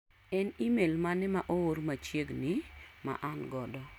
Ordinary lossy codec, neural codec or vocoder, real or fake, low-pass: none; none; real; 19.8 kHz